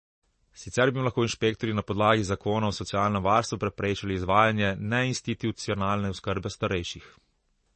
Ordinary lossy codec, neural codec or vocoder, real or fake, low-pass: MP3, 32 kbps; none; real; 10.8 kHz